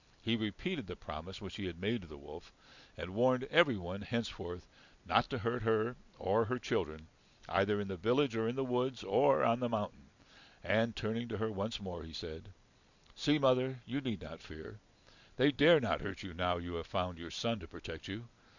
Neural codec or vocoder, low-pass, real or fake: none; 7.2 kHz; real